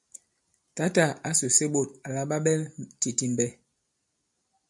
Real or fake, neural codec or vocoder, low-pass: real; none; 10.8 kHz